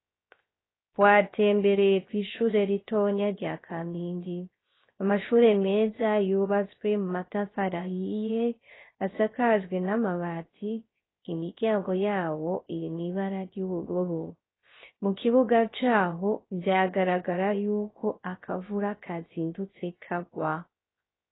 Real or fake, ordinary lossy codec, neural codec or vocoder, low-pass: fake; AAC, 16 kbps; codec, 16 kHz, 0.3 kbps, FocalCodec; 7.2 kHz